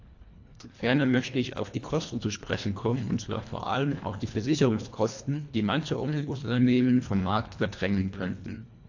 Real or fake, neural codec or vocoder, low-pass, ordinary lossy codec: fake; codec, 24 kHz, 1.5 kbps, HILCodec; 7.2 kHz; AAC, 48 kbps